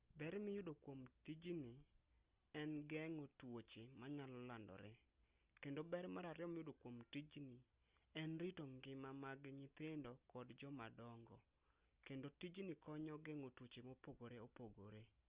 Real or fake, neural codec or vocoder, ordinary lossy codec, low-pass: real; none; Opus, 32 kbps; 3.6 kHz